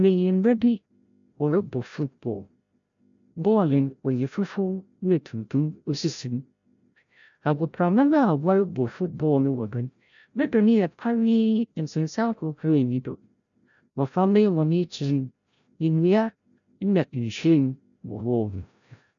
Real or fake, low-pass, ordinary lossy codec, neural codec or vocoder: fake; 7.2 kHz; MP3, 96 kbps; codec, 16 kHz, 0.5 kbps, FreqCodec, larger model